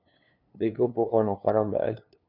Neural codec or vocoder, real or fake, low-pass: codec, 16 kHz, 2 kbps, FunCodec, trained on LibriTTS, 25 frames a second; fake; 5.4 kHz